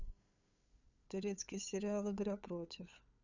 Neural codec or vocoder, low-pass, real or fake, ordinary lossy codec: codec, 16 kHz, 8 kbps, FunCodec, trained on LibriTTS, 25 frames a second; 7.2 kHz; fake; none